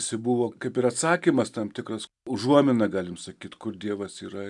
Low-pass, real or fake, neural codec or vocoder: 10.8 kHz; real; none